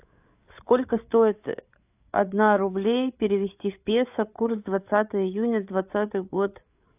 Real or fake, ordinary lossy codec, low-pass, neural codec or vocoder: fake; AAC, 32 kbps; 3.6 kHz; codec, 16 kHz, 8 kbps, FreqCodec, larger model